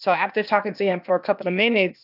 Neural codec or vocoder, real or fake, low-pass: codec, 16 kHz, 0.8 kbps, ZipCodec; fake; 5.4 kHz